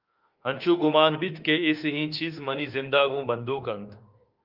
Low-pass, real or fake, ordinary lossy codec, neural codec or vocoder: 5.4 kHz; fake; Opus, 24 kbps; autoencoder, 48 kHz, 32 numbers a frame, DAC-VAE, trained on Japanese speech